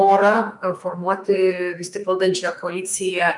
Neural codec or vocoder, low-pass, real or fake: autoencoder, 48 kHz, 32 numbers a frame, DAC-VAE, trained on Japanese speech; 10.8 kHz; fake